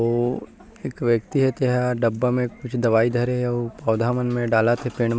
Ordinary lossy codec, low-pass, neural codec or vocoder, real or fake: none; none; none; real